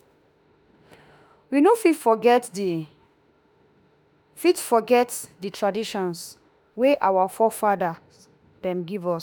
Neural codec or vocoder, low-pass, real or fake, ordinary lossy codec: autoencoder, 48 kHz, 32 numbers a frame, DAC-VAE, trained on Japanese speech; none; fake; none